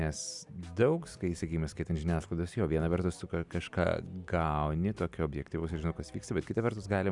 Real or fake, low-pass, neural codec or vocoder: real; 10.8 kHz; none